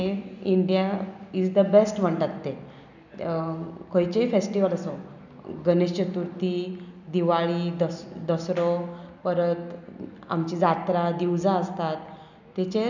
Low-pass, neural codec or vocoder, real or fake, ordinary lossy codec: 7.2 kHz; none; real; none